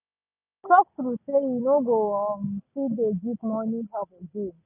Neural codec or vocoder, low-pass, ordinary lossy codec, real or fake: none; 3.6 kHz; none; real